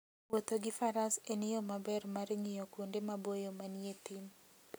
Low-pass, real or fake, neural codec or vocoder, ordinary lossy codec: none; real; none; none